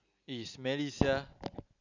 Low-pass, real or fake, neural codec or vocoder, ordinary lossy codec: 7.2 kHz; real; none; none